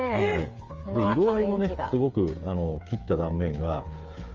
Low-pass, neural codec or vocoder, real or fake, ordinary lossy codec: 7.2 kHz; codec, 16 kHz, 8 kbps, FreqCodec, smaller model; fake; Opus, 32 kbps